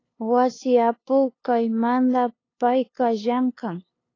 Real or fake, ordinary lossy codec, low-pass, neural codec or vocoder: fake; AAC, 32 kbps; 7.2 kHz; codec, 16 kHz, 2 kbps, FunCodec, trained on LibriTTS, 25 frames a second